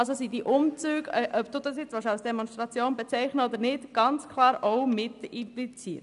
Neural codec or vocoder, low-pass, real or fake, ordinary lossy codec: none; 10.8 kHz; real; none